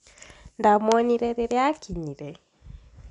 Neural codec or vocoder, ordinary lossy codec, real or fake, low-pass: none; none; real; 10.8 kHz